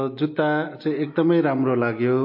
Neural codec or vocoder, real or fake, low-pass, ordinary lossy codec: none; real; 5.4 kHz; MP3, 32 kbps